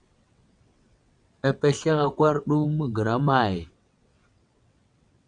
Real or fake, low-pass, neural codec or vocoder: fake; 9.9 kHz; vocoder, 22.05 kHz, 80 mel bands, WaveNeXt